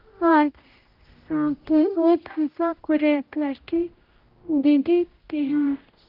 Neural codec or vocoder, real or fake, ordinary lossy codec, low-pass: codec, 16 kHz, 0.5 kbps, X-Codec, HuBERT features, trained on general audio; fake; Opus, 32 kbps; 5.4 kHz